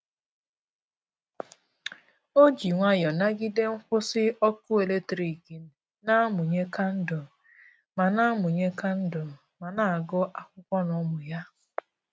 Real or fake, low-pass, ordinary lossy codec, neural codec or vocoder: real; none; none; none